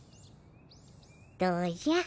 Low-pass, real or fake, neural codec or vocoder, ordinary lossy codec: none; real; none; none